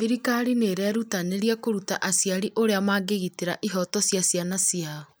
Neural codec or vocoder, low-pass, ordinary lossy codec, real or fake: none; none; none; real